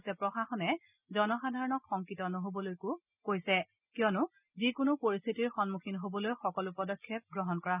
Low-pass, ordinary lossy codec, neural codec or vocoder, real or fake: 3.6 kHz; none; none; real